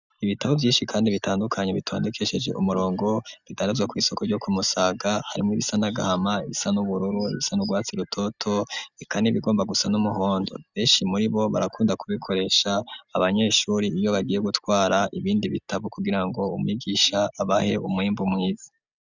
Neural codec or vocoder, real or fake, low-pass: none; real; 7.2 kHz